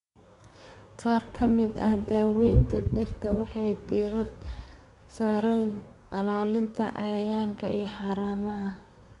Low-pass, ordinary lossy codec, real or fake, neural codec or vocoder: 10.8 kHz; none; fake; codec, 24 kHz, 1 kbps, SNAC